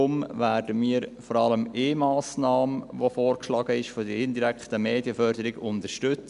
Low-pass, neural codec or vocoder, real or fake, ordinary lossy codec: 10.8 kHz; none; real; none